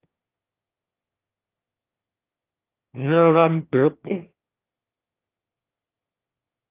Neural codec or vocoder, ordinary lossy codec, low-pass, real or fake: autoencoder, 22.05 kHz, a latent of 192 numbers a frame, VITS, trained on one speaker; Opus, 24 kbps; 3.6 kHz; fake